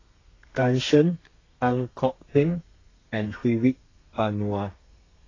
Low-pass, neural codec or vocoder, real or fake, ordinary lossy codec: 7.2 kHz; codec, 32 kHz, 1.9 kbps, SNAC; fake; AAC, 32 kbps